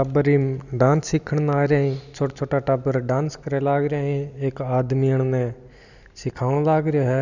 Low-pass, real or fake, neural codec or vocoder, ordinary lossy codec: 7.2 kHz; real; none; none